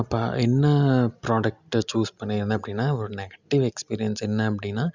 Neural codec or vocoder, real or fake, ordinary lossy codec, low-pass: none; real; Opus, 64 kbps; 7.2 kHz